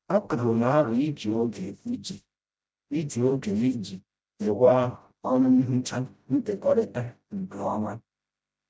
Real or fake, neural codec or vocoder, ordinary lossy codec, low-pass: fake; codec, 16 kHz, 0.5 kbps, FreqCodec, smaller model; none; none